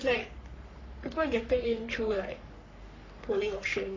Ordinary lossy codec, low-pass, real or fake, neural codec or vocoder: AAC, 32 kbps; 7.2 kHz; fake; codec, 44.1 kHz, 3.4 kbps, Pupu-Codec